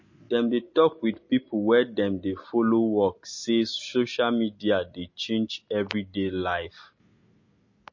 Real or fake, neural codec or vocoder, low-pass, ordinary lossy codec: real; none; 7.2 kHz; MP3, 32 kbps